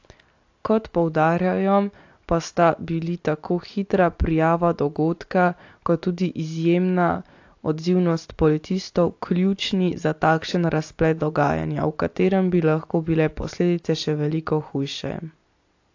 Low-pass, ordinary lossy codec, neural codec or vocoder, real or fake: 7.2 kHz; AAC, 48 kbps; none; real